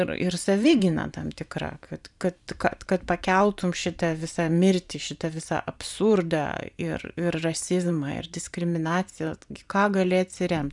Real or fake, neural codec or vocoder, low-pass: fake; vocoder, 44.1 kHz, 128 mel bands every 512 samples, BigVGAN v2; 10.8 kHz